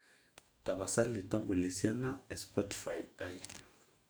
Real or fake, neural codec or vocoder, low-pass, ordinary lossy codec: fake; codec, 44.1 kHz, 2.6 kbps, DAC; none; none